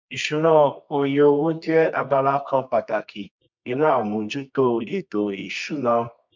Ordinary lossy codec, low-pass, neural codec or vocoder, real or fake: MP3, 64 kbps; 7.2 kHz; codec, 24 kHz, 0.9 kbps, WavTokenizer, medium music audio release; fake